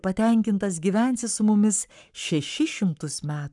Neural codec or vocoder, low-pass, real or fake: codec, 44.1 kHz, 7.8 kbps, Pupu-Codec; 10.8 kHz; fake